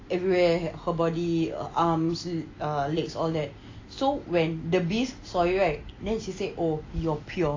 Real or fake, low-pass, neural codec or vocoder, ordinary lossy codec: real; 7.2 kHz; none; AAC, 32 kbps